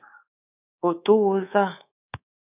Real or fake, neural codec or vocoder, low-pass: fake; codec, 24 kHz, 3.1 kbps, DualCodec; 3.6 kHz